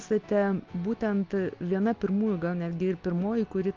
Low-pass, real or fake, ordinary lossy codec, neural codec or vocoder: 7.2 kHz; real; Opus, 32 kbps; none